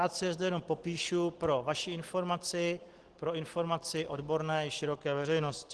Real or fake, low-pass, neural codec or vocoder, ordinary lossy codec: real; 10.8 kHz; none; Opus, 16 kbps